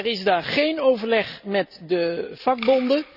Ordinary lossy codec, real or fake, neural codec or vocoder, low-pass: none; real; none; 5.4 kHz